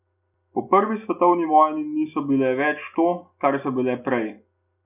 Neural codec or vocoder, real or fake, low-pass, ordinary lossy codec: none; real; 3.6 kHz; none